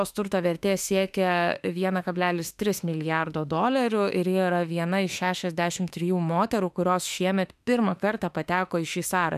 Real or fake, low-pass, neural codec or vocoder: fake; 14.4 kHz; autoencoder, 48 kHz, 32 numbers a frame, DAC-VAE, trained on Japanese speech